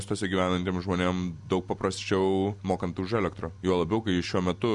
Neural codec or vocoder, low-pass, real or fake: none; 10.8 kHz; real